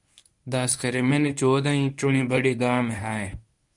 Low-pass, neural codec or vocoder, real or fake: 10.8 kHz; codec, 24 kHz, 0.9 kbps, WavTokenizer, medium speech release version 1; fake